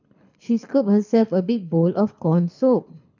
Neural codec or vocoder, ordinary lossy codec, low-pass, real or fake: codec, 24 kHz, 6 kbps, HILCodec; none; 7.2 kHz; fake